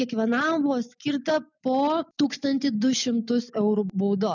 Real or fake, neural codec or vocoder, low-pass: real; none; 7.2 kHz